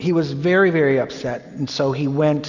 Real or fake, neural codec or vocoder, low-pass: real; none; 7.2 kHz